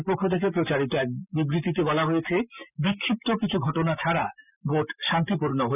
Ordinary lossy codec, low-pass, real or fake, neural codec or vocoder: none; 3.6 kHz; real; none